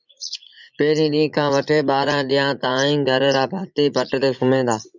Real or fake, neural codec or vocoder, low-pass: fake; vocoder, 44.1 kHz, 80 mel bands, Vocos; 7.2 kHz